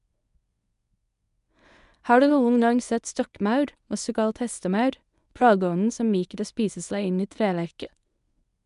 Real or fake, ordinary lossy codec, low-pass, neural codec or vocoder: fake; none; 10.8 kHz; codec, 24 kHz, 0.9 kbps, WavTokenizer, medium speech release version 1